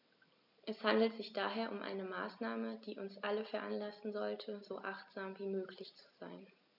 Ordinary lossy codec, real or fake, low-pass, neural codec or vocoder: none; real; 5.4 kHz; none